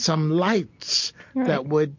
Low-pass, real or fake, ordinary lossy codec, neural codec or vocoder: 7.2 kHz; real; MP3, 48 kbps; none